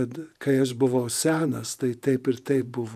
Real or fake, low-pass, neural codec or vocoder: fake; 14.4 kHz; vocoder, 48 kHz, 128 mel bands, Vocos